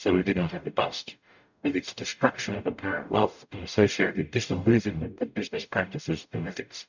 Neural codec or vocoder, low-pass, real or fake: codec, 44.1 kHz, 0.9 kbps, DAC; 7.2 kHz; fake